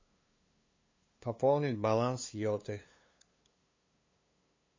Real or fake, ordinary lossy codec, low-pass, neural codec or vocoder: fake; MP3, 32 kbps; 7.2 kHz; codec, 16 kHz, 2 kbps, FunCodec, trained on LibriTTS, 25 frames a second